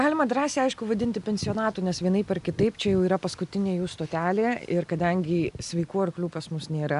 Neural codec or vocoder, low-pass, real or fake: none; 10.8 kHz; real